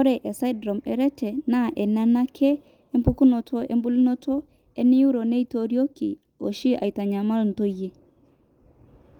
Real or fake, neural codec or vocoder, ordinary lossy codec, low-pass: real; none; Opus, 32 kbps; 19.8 kHz